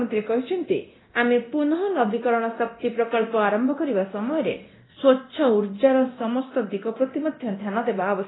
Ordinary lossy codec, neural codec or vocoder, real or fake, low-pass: AAC, 16 kbps; codec, 24 kHz, 0.9 kbps, DualCodec; fake; 7.2 kHz